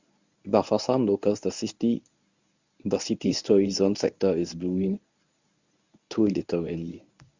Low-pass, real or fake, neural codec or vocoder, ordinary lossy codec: 7.2 kHz; fake; codec, 24 kHz, 0.9 kbps, WavTokenizer, medium speech release version 1; Opus, 64 kbps